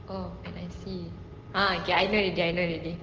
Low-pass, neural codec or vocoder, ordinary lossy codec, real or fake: 7.2 kHz; none; Opus, 16 kbps; real